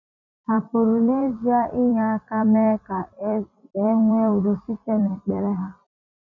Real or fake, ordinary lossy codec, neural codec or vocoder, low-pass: fake; MP3, 64 kbps; vocoder, 44.1 kHz, 128 mel bands every 256 samples, BigVGAN v2; 7.2 kHz